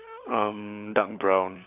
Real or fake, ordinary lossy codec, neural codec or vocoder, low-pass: fake; none; codec, 16 kHz, 16 kbps, FunCodec, trained on LibriTTS, 50 frames a second; 3.6 kHz